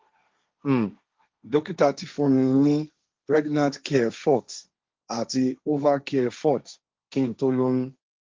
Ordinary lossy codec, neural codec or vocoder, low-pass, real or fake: Opus, 32 kbps; codec, 16 kHz, 1.1 kbps, Voila-Tokenizer; 7.2 kHz; fake